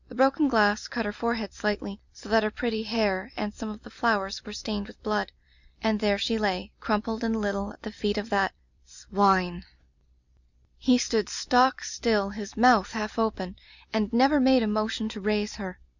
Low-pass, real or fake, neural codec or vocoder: 7.2 kHz; fake; vocoder, 44.1 kHz, 128 mel bands every 256 samples, BigVGAN v2